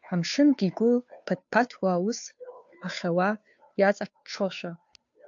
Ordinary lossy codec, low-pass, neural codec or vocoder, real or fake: AAC, 64 kbps; 7.2 kHz; codec, 16 kHz, 2 kbps, FunCodec, trained on Chinese and English, 25 frames a second; fake